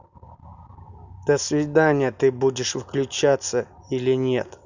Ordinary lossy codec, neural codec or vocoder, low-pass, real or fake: none; none; 7.2 kHz; real